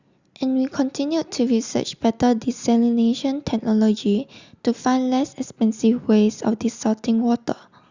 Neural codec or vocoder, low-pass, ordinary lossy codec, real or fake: none; 7.2 kHz; Opus, 64 kbps; real